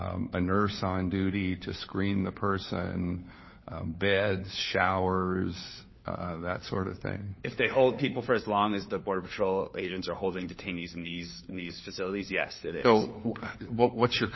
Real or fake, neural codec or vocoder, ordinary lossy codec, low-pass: fake; codec, 16 kHz, 4 kbps, FunCodec, trained on LibriTTS, 50 frames a second; MP3, 24 kbps; 7.2 kHz